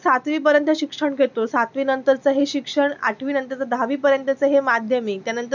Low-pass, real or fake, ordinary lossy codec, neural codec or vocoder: 7.2 kHz; real; none; none